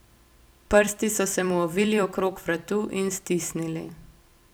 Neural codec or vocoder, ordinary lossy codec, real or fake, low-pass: vocoder, 44.1 kHz, 128 mel bands every 256 samples, BigVGAN v2; none; fake; none